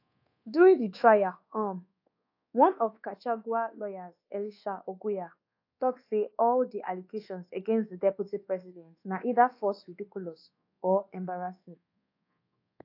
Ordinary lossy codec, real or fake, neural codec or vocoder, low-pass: AAC, 32 kbps; fake; codec, 24 kHz, 1.2 kbps, DualCodec; 5.4 kHz